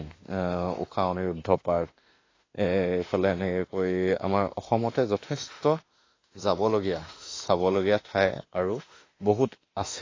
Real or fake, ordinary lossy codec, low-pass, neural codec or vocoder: fake; AAC, 32 kbps; 7.2 kHz; autoencoder, 48 kHz, 32 numbers a frame, DAC-VAE, trained on Japanese speech